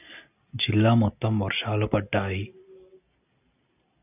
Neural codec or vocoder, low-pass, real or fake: none; 3.6 kHz; real